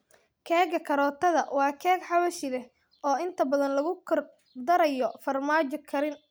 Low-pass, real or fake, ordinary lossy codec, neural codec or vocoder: none; real; none; none